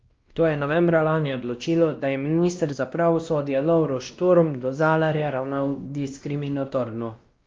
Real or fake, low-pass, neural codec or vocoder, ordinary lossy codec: fake; 7.2 kHz; codec, 16 kHz, 1 kbps, X-Codec, WavLM features, trained on Multilingual LibriSpeech; Opus, 32 kbps